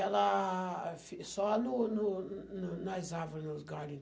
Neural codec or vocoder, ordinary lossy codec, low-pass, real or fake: none; none; none; real